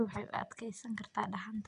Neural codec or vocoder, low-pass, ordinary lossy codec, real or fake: none; 9.9 kHz; none; real